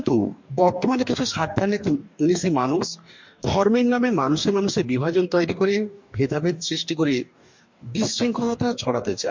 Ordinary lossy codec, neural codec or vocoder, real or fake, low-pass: MP3, 48 kbps; codec, 44.1 kHz, 2.6 kbps, DAC; fake; 7.2 kHz